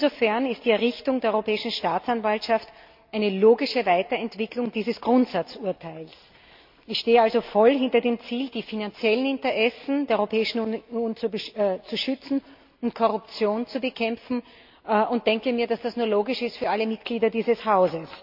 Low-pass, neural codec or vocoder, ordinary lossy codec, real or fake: 5.4 kHz; none; MP3, 48 kbps; real